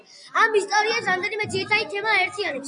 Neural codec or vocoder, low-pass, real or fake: none; 9.9 kHz; real